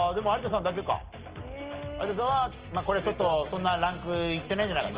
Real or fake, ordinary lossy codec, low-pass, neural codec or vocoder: real; Opus, 16 kbps; 3.6 kHz; none